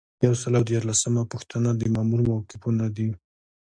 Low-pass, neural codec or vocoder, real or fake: 9.9 kHz; none; real